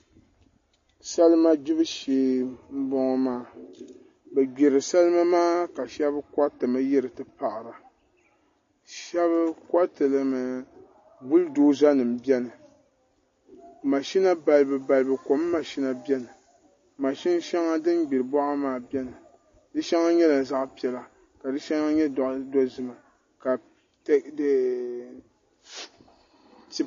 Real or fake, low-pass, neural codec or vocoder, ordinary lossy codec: real; 7.2 kHz; none; MP3, 32 kbps